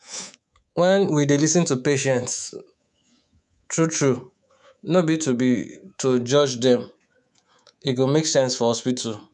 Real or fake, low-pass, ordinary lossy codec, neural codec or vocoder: fake; 10.8 kHz; none; codec, 24 kHz, 3.1 kbps, DualCodec